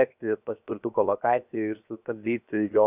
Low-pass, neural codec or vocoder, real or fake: 3.6 kHz; codec, 16 kHz, 0.7 kbps, FocalCodec; fake